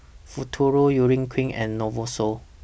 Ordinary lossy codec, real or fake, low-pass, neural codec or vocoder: none; real; none; none